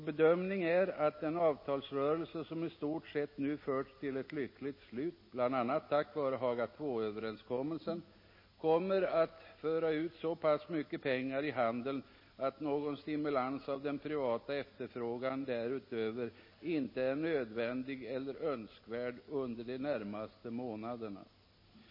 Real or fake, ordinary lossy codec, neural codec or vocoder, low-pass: real; MP3, 24 kbps; none; 5.4 kHz